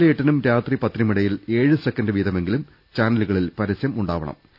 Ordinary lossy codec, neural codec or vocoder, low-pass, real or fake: none; none; 5.4 kHz; real